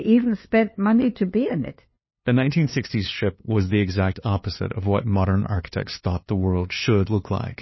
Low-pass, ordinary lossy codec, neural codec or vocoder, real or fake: 7.2 kHz; MP3, 24 kbps; codec, 16 kHz, 2 kbps, FunCodec, trained on LibriTTS, 25 frames a second; fake